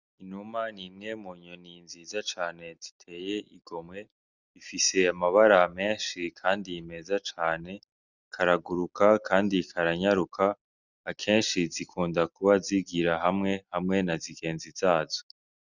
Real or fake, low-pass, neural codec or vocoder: real; 7.2 kHz; none